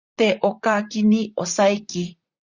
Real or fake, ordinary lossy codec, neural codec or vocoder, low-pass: fake; Opus, 64 kbps; vocoder, 44.1 kHz, 128 mel bands, Pupu-Vocoder; 7.2 kHz